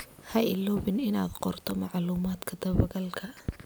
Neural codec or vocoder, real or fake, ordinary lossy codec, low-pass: none; real; none; none